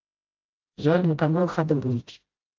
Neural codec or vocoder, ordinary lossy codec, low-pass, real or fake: codec, 16 kHz, 0.5 kbps, FreqCodec, smaller model; Opus, 24 kbps; 7.2 kHz; fake